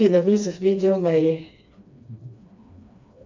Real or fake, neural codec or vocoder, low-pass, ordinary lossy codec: fake; codec, 16 kHz, 2 kbps, FreqCodec, smaller model; 7.2 kHz; MP3, 64 kbps